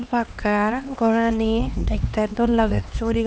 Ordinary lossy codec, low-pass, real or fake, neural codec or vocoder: none; none; fake; codec, 16 kHz, 2 kbps, X-Codec, HuBERT features, trained on LibriSpeech